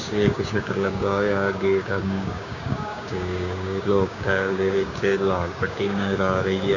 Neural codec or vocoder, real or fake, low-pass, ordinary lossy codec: codec, 16 kHz, 6 kbps, DAC; fake; 7.2 kHz; none